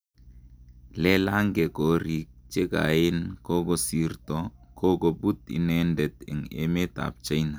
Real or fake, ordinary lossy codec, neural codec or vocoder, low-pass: real; none; none; none